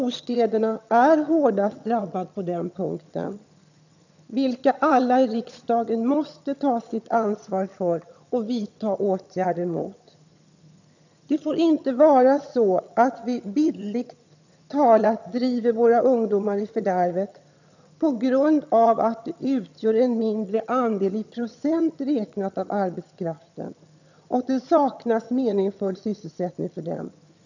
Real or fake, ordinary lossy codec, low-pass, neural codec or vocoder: fake; none; 7.2 kHz; vocoder, 22.05 kHz, 80 mel bands, HiFi-GAN